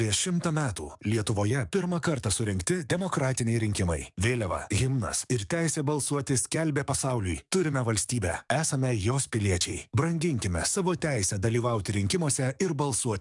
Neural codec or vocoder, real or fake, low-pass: codec, 44.1 kHz, 7.8 kbps, DAC; fake; 10.8 kHz